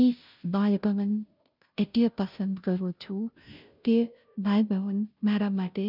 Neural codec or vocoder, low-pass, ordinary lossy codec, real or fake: codec, 16 kHz, 0.5 kbps, FunCodec, trained on Chinese and English, 25 frames a second; 5.4 kHz; none; fake